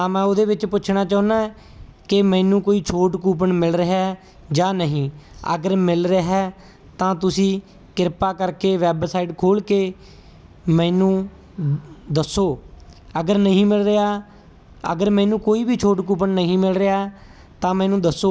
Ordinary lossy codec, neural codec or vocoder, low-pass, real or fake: none; none; none; real